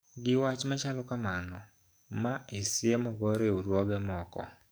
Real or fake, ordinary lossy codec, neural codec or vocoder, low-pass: fake; none; codec, 44.1 kHz, 7.8 kbps, DAC; none